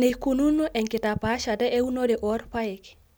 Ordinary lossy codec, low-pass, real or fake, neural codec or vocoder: none; none; real; none